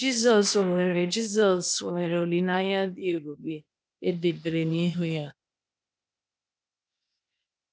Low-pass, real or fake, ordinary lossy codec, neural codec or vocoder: none; fake; none; codec, 16 kHz, 0.8 kbps, ZipCodec